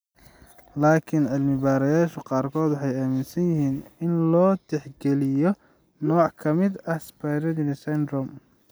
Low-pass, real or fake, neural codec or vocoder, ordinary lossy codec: none; real; none; none